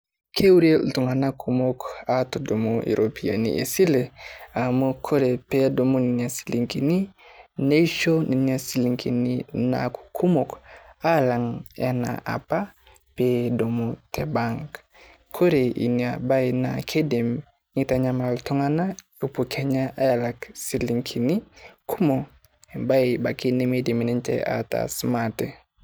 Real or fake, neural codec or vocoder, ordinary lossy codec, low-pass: real; none; none; none